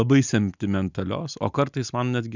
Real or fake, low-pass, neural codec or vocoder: real; 7.2 kHz; none